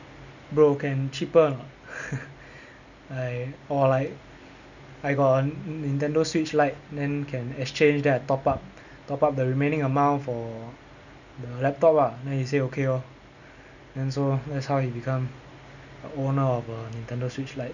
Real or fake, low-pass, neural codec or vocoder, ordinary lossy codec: real; 7.2 kHz; none; none